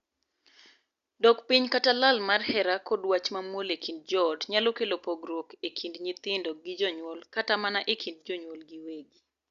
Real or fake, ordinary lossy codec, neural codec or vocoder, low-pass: real; Opus, 64 kbps; none; 7.2 kHz